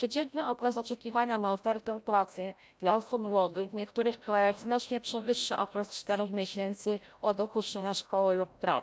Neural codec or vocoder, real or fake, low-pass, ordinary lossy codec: codec, 16 kHz, 0.5 kbps, FreqCodec, larger model; fake; none; none